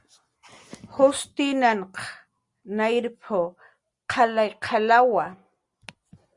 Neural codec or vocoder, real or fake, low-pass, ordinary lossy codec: none; real; 10.8 kHz; Opus, 64 kbps